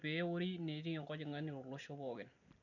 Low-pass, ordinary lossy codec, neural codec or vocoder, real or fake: 7.2 kHz; none; none; real